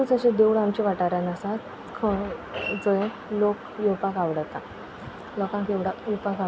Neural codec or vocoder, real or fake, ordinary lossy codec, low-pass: none; real; none; none